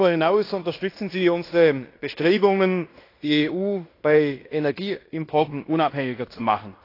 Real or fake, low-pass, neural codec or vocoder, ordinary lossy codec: fake; 5.4 kHz; codec, 16 kHz in and 24 kHz out, 0.9 kbps, LongCat-Audio-Codec, fine tuned four codebook decoder; AAC, 32 kbps